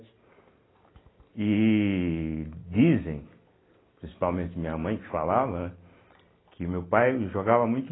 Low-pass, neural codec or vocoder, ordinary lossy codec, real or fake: 7.2 kHz; none; AAC, 16 kbps; real